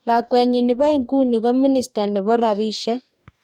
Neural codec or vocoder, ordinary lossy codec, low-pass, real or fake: codec, 44.1 kHz, 2.6 kbps, DAC; none; 19.8 kHz; fake